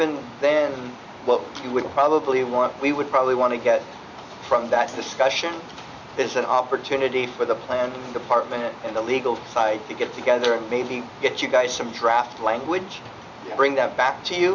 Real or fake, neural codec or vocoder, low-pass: real; none; 7.2 kHz